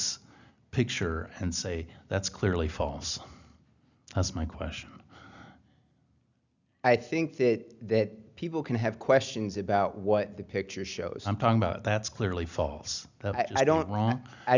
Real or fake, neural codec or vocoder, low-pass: real; none; 7.2 kHz